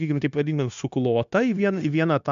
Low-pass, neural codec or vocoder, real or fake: 7.2 kHz; codec, 16 kHz, 0.9 kbps, LongCat-Audio-Codec; fake